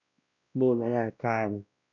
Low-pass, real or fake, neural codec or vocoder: 7.2 kHz; fake; codec, 16 kHz, 1 kbps, X-Codec, WavLM features, trained on Multilingual LibriSpeech